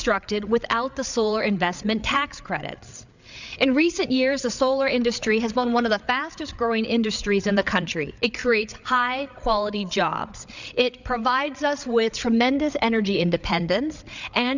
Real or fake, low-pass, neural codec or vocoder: fake; 7.2 kHz; codec, 16 kHz, 8 kbps, FreqCodec, larger model